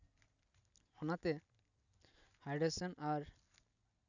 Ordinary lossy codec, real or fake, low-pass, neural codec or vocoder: none; real; 7.2 kHz; none